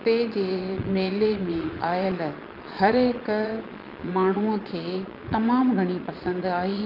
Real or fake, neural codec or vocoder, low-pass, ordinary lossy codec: fake; vocoder, 22.05 kHz, 80 mel bands, Vocos; 5.4 kHz; Opus, 24 kbps